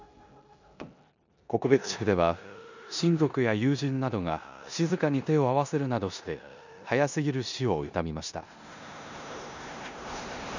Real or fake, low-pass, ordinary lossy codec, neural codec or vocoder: fake; 7.2 kHz; none; codec, 16 kHz in and 24 kHz out, 0.9 kbps, LongCat-Audio-Codec, four codebook decoder